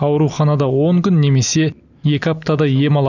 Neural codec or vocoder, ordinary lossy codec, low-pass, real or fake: none; none; 7.2 kHz; real